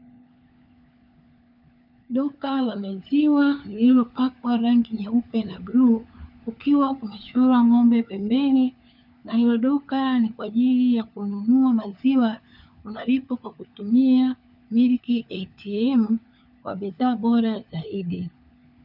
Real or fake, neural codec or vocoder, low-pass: fake; codec, 16 kHz, 4 kbps, FunCodec, trained on LibriTTS, 50 frames a second; 5.4 kHz